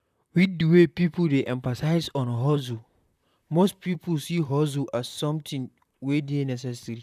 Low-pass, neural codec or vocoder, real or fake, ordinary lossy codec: 14.4 kHz; vocoder, 44.1 kHz, 128 mel bands, Pupu-Vocoder; fake; none